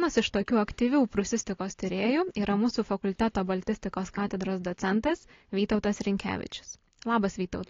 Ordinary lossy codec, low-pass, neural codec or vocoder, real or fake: AAC, 32 kbps; 7.2 kHz; none; real